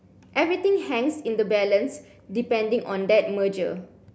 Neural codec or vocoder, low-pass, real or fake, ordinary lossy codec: none; none; real; none